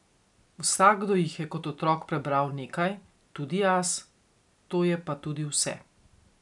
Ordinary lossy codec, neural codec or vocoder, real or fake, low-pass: none; none; real; 10.8 kHz